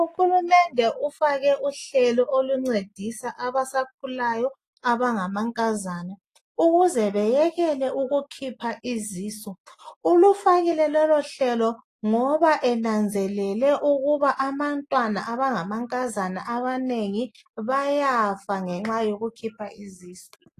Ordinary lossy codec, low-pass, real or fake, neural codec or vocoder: AAC, 48 kbps; 14.4 kHz; real; none